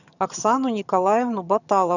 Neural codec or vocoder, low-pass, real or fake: vocoder, 22.05 kHz, 80 mel bands, HiFi-GAN; 7.2 kHz; fake